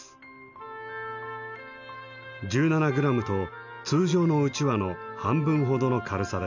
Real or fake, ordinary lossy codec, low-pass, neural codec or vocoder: real; none; 7.2 kHz; none